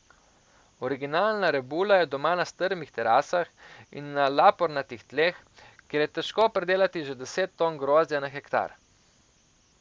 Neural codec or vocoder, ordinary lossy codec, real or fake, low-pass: none; none; real; none